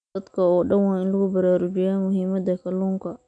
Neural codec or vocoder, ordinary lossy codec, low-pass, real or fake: none; none; 9.9 kHz; real